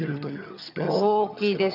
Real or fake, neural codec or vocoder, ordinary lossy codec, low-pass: fake; vocoder, 22.05 kHz, 80 mel bands, HiFi-GAN; none; 5.4 kHz